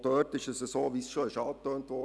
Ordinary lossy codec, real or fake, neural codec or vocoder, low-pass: none; real; none; none